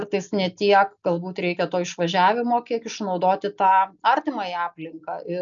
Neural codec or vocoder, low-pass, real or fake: none; 7.2 kHz; real